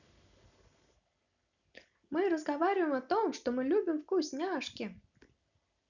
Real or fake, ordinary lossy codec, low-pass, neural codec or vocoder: real; none; 7.2 kHz; none